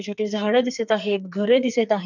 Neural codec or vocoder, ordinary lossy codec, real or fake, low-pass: codec, 44.1 kHz, 2.6 kbps, SNAC; none; fake; 7.2 kHz